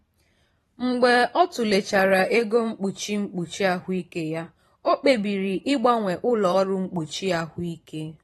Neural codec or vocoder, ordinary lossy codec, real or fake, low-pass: vocoder, 44.1 kHz, 128 mel bands every 512 samples, BigVGAN v2; AAC, 32 kbps; fake; 19.8 kHz